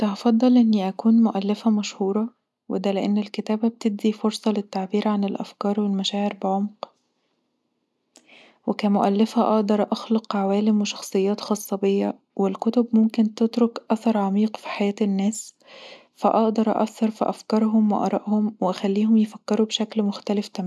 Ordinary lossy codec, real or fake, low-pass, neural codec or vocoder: none; real; none; none